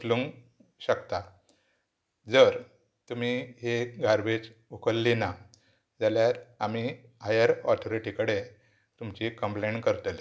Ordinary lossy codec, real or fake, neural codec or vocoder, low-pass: none; real; none; none